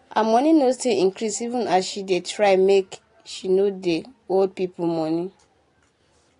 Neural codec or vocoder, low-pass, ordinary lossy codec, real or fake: none; 10.8 kHz; AAC, 48 kbps; real